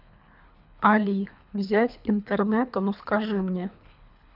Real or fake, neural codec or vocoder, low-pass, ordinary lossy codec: fake; codec, 24 kHz, 3 kbps, HILCodec; 5.4 kHz; none